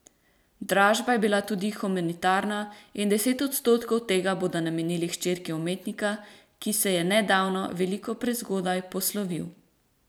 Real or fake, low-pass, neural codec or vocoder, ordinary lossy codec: fake; none; vocoder, 44.1 kHz, 128 mel bands every 256 samples, BigVGAN v2; none